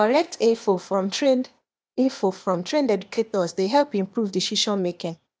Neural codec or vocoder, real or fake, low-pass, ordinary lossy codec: codec, 16 kHz, 0.8 kbps, ZipCodec; fake; none; none